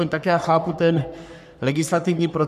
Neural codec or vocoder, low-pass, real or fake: codec, 44.1 kHz, 3.4 kbps, Pupu-Codec; 14.4 kHz; fake